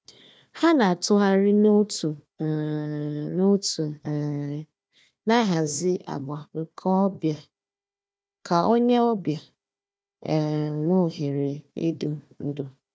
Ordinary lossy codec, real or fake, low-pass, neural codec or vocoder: none; fake; none; codec, 16 kHz, 1 kbps, FunCodec, trained on Chinese and English, 50 frames a second